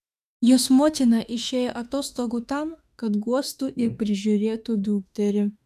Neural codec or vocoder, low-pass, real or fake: autoencoder, 48 kHz, 32 numbers a frame, DAC-VAE, trained on Japanese speech; 14.4 kHz; fake